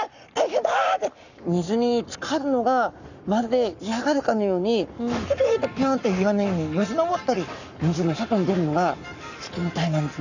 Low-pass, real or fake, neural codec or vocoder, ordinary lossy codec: 7.2 kHz; fake; codec, 44.1 kHz, 7.8 kbps, Pupu-Codec; none